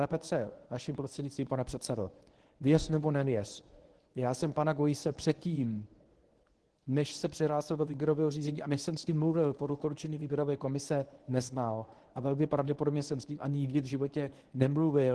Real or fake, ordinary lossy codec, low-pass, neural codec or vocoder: fake; Opus, 16 kbps; 10.8 kHz; codec, 24 kHz, 0.9 kbps, WavTokenizer, medium speech release version 1